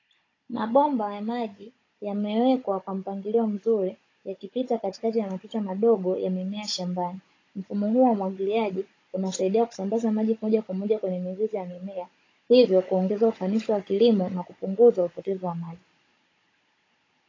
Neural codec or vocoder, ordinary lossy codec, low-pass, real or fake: codec, 16 kHz, 16 kbps, FunCodec, trained on Chinese and English, 50 frames a second; AAC, 32 kbps; 7.2 kHz; fake